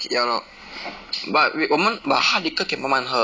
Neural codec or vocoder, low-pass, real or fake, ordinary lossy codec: none; none; real; none